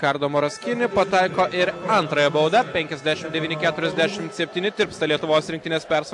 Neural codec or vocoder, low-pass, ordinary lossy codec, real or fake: none; 10.8 kHz; AAC, 64 kbps; real